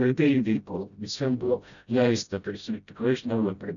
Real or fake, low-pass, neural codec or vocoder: fake; 7.2 kHz; codec, 16 kHz, 0.5 kbps, FreqCodec, smaller model